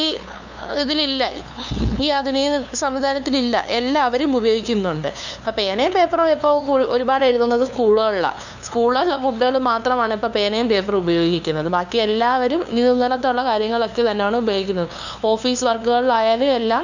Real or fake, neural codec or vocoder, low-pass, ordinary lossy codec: fake; codec, 16 kHz, 2 kbps, FunCodec, trained on LibriTTS, 25 frames a second; 7.2 kHz; none